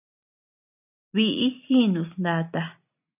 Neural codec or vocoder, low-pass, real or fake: none; 3.6 kHz; real